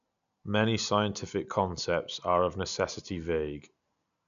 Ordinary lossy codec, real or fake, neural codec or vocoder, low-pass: none; real; none; 7.2 kHz